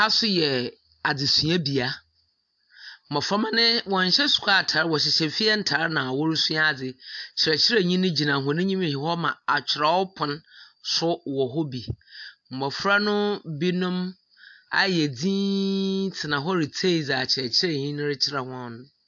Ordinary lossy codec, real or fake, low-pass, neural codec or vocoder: AAC, 64 kbps; real; 7.2 kHz; none